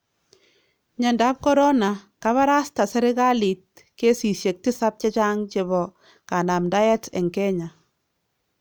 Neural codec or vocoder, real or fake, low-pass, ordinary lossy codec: none; real; none; none